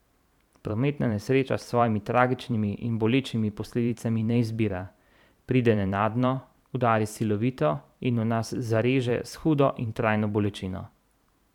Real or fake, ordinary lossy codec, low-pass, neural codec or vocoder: fake; none; 19.8 kHz; vocoder, 44.1 kHz, 128 mel bands every 512 samples, BigVGAN v2